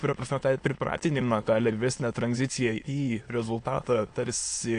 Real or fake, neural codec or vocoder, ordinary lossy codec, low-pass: fake; autoencoder, 22.05 kHz, a latent of 192 numbers a frame, VITS, trained on many speakers; AAC, 48 kbps; 9.9 kHz